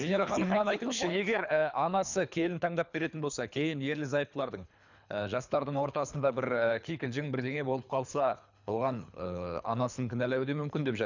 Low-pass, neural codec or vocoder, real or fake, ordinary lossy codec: 7.2 kHz; codec, 24 kHz, 3 kbps, HILCodec; fake; none